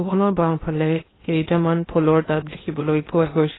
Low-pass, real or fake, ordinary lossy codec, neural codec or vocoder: 7.2 kHz; fake; AAC, 16 kbps; codec, 16 kHz in and 24 kHz out, 0.8 kbps, FocalCodec, streaming, 65536 codes